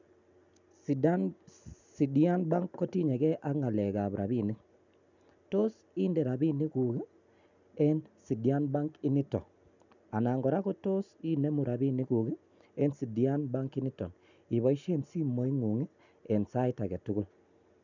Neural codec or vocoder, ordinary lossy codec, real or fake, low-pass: none; none; real; 7.2 kHz